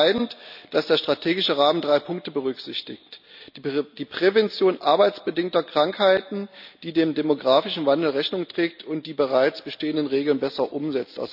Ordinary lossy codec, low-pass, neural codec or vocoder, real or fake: none; 5.4 kHz; none; real